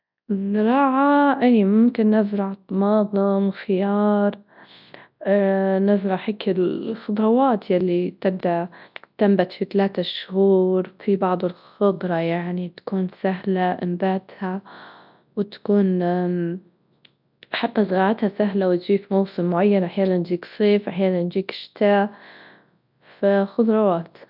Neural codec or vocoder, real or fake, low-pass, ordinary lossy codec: codec, 24 kHz, 0.9 kbps, WavTokenizer, large speech release; fake; 5.4 kHz; none